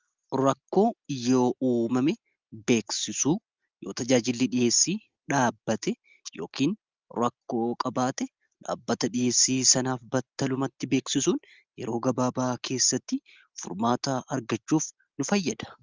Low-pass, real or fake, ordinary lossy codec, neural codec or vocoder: 7.2 kHz; real; Opus, 32 kbps; none